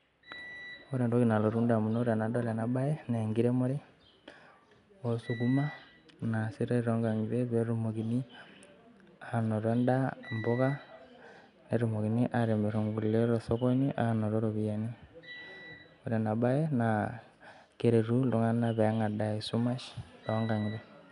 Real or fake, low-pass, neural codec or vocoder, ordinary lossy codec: real; 10.8 kHz; none; none